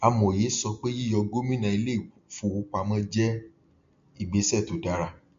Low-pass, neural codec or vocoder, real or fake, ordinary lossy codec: 7.2 kHz; none; real; MP3, 48 kbps